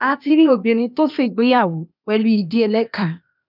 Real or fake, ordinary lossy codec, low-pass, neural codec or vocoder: fake; none; 5.4 kHz; codec, 16 kHz, 0.8 kbps, ZipCodec